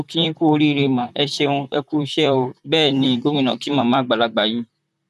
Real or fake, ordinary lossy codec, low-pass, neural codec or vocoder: fake; none; 14.4 kHz; vocoder, 44.1 kHz, 128 mel bands, Pupu-Vocoder